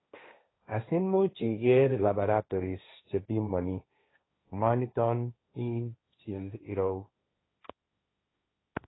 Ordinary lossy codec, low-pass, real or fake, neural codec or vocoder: AAC, 16 kbps; 7.2 kHz; fake; codec, 16 kHz, 1.1 kbps, Voila-Tokenizer